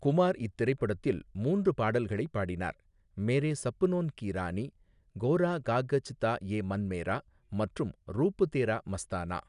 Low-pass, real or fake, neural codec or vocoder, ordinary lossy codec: 10.8 kHz; real; none; none